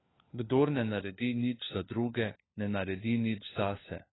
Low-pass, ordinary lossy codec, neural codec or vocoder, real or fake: 7.2 kHz; AAC, 16 kbps; codec, 16 kHz, 4 kbps, FunCodec, trained on LibriTTS, 50 frames a second; fake